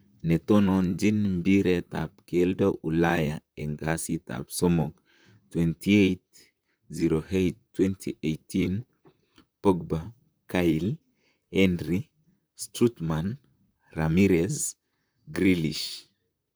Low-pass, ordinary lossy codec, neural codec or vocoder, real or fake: none; none; vocoder, 44.1 kHz, 128 mel bands, Pupu-Vocoder; fake